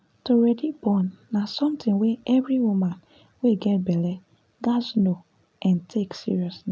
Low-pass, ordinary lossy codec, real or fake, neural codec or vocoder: none; none; real; none